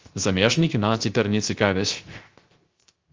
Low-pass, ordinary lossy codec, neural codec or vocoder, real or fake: 7.2 kHz; Opus, 24 kbps; codec, 16 kHz, 0.3 kbps, FocalCodec; fake